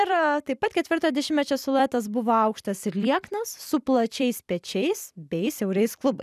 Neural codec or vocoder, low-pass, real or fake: vocoder, 44.1 kHz, 128 mel bands every 256 samples, BigVGAN v2; 14.4 kHz; fake